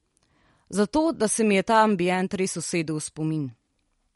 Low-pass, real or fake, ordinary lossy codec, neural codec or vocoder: 19.8 kHz; real; MP3, 48 kbps; none